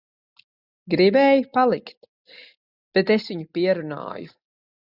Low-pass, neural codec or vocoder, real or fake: 5.4 kHz; none; real